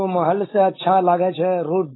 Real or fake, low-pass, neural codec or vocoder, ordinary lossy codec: fake; 7.2 kHz; codec, 16 kHz, 4.8 kbps, FACodec; AAC, 16 kbps